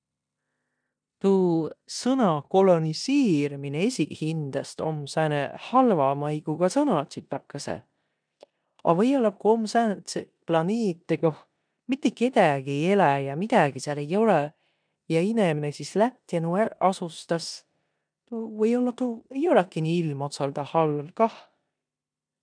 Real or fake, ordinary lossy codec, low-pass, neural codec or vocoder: fake; none; 9.9 kHz; codec, 16 kHz in and 24 kHz out, 0.9 kbps, LongCat-Audio-Codec, four codebook decoder